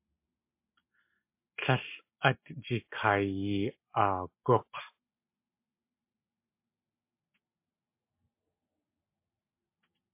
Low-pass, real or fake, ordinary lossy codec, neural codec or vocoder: 3.6 kHz; real; MP3, 24 kbps; none